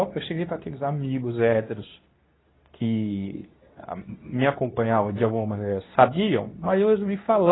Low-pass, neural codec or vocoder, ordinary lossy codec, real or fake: 7.2 kHz; codec, 24 kHz, 0.9 kbps, WavTokenizer, medium speech release version 2; AAC, 16 kbps; fake